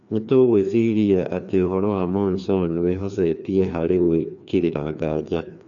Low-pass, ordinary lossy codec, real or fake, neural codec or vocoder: 7.2 kHz; none; fake; codec, 16 kHz, 2 kbps, FreqCodec, larger model